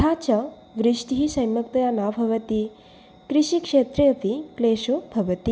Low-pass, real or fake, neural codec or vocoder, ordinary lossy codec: none; real; none; none